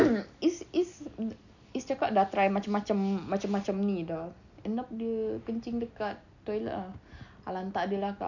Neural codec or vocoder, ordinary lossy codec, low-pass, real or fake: none; none; 7.2 kHz; real